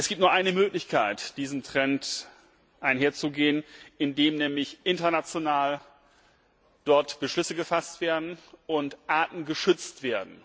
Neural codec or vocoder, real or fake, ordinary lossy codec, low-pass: none; real; none; none